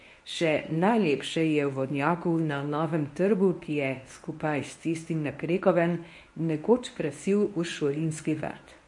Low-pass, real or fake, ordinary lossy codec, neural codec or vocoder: 10.8 kHz; fake; MP3, 48 kbps; codec, 24 kHz, 0.9 kbps, WavTokenizer, medium speech release version 1